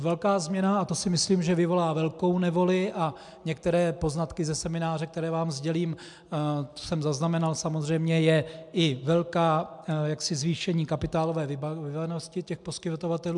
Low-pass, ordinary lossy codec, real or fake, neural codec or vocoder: 10.8 kHz; MP3, 96 kbps; real; none